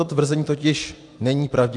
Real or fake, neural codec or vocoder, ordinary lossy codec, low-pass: real; none; AAC, 64 kbps; 10.8 kHz